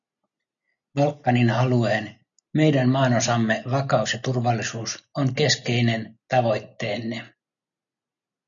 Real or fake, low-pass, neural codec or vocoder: real; 7.2 kHz; none